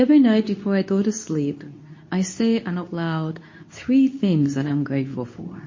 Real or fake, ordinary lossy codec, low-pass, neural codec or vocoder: fake; MP3, 32 kbps; 7.2 kHz; codec, 24 kHz, 0.9 kbps, WavTokenizer, medium speech release version 2